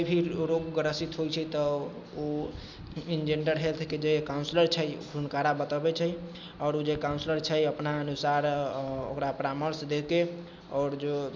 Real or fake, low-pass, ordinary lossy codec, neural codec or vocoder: real; none; none; none